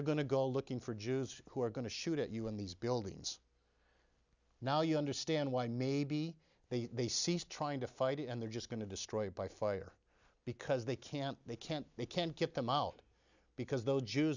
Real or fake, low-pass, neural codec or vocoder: real; 7.2 kHz; none